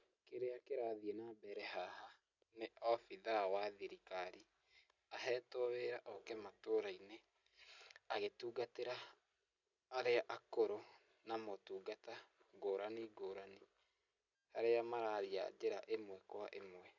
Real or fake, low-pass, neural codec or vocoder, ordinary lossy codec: real; 7.2 kHz; none; none